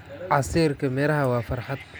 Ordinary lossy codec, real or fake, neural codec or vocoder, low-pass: none; real; none; none